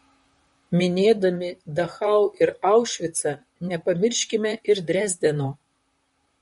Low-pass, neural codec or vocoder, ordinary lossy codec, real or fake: 19.8 kHz; vocoder, 44.1 kHz, 128 mel bands, Pupu-Vocoder; MP3, 48 kbps; fake